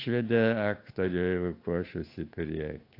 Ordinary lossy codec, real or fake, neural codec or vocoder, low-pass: AAC, 32 kbps; fake; codec, 16 kHz, 8 kbps, FunCodec, trained on Chinese and English, 25 frames a second; 5.4 kHz